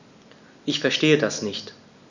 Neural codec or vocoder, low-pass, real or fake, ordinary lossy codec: none; 7.2 kHz; real; none